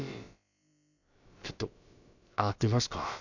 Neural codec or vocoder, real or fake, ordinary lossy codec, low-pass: codec, 16 kHz, about 1 kbps, DyCAST, with the encoder's durations; fake; none; 7.2 kHz